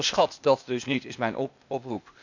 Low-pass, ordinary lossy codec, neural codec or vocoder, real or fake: 7.2 kHz; none; codec, 16 kHz, 0.8 kbps, ZipCodec; fake